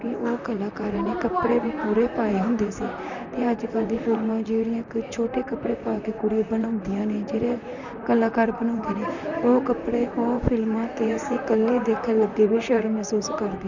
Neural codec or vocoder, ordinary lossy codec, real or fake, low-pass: vocoder, 44.1 kHz, 128 mel bands, Pupu-Vocoder; none; fake; 7.2 kHz